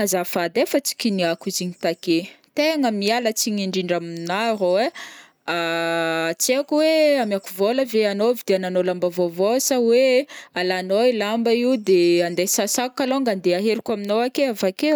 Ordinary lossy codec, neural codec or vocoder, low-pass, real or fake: none; none; none; real